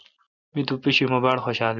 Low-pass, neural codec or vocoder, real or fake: 7.2 kHz; none; real